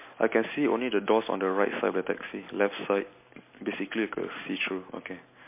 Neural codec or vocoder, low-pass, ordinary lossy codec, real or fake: none; 3.6 kHz; MP3, 24 kbps; real